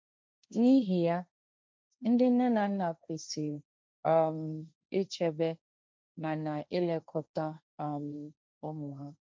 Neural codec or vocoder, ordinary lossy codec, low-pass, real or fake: codec, 16 kHz, 1.1 kbps, Voila-Tokenizer; none; none; fake